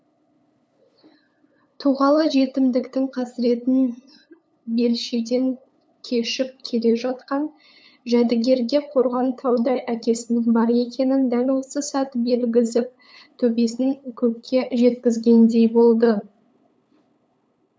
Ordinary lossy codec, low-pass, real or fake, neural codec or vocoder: none; none; fake; codec, 16 kHz, 8 kbps, FunCodec, trained on LibriTTS, 25 frames a second